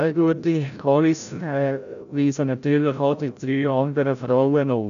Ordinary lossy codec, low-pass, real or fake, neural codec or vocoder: none; 7.2 kHz; fake; codec, 16 kHz, 0.5 kbps, FreqCodec, larger model